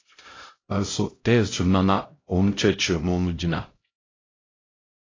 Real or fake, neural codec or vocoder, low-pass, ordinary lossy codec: fake; codec, 16 kHz, 0.5 kbps, X-Codec, HuBERT features, trained on LibriSpeech; 7.2 kHz; AAC, 32 kbps